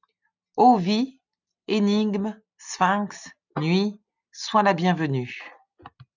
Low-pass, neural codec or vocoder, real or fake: 7.2 kHz; none; real